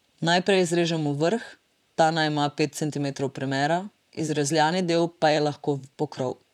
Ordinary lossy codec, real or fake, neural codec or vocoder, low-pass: none; fake; vocoder, 44.1 kHz, 128 mel bands, Pupu-Vocoder; 19.8 kHz